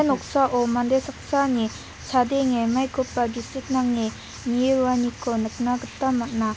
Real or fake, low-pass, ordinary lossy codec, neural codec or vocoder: real; none; none; none